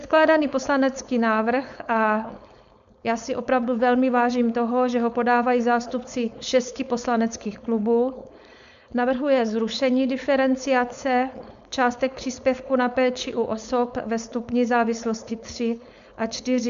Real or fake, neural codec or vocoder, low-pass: fake; codec, 16 kHz, 4.8 kbps, FACodec; 7.2 kHz